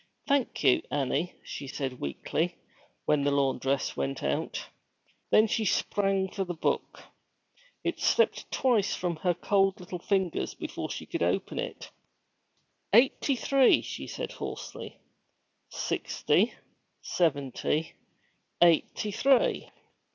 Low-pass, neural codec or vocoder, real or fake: 7.2 kHz; none; real